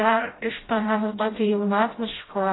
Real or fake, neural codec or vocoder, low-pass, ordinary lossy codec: fake; codec, 16 kHz, 0.5 kbps, FreqCodec, smaller model; 7.2 kHz; AAC, 16 kbps